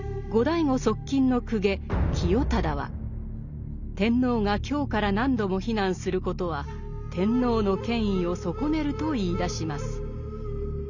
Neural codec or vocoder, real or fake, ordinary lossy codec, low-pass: none; real; none; 7.2 kHz